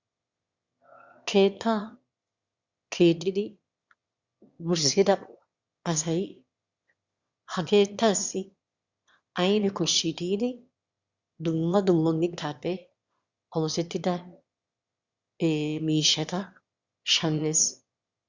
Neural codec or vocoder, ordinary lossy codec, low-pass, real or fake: autoencoder, 22.05 kHz, a latent of 192 numbers a frame, VITS, trained on one speaker; Opus, 64 kbps; 7.2 kHz; fake